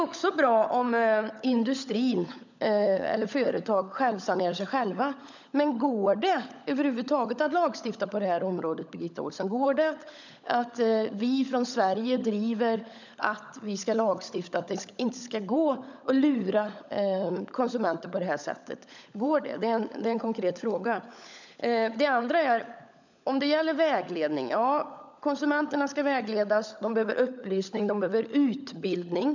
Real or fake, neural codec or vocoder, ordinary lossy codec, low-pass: fake; codec, 16 kHz, 16 kbps, FunCodec, trained on LibriTTS, 50 frames a second; none; 7.2 kHz